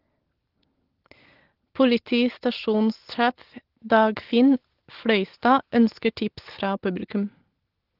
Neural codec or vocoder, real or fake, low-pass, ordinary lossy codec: none; real; 5.4 kHz; Opus, 16 kbps